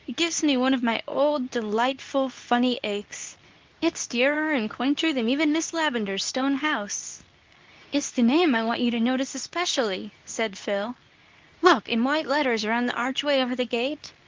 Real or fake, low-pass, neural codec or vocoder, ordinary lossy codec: fake; 7.2 kHz; codec, 24 kHz, 0.9 kbps, WavTokenizer, medium speech release version 2; Opus, 32 kbps